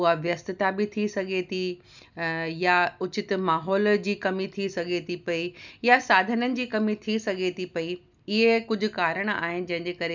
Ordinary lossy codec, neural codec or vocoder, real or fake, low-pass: none; none; real; 7.2 kHz